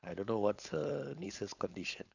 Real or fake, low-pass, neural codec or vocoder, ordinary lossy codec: fake; 7.2 kHz; codec, 16 kHz, 4.8 kbps, FACodec; none